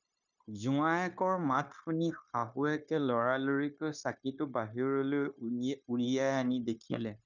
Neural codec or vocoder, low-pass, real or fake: codec, 16 kHz, 0.9 kbps, LongCat-Audio-Codec; 7.2 kHz; fake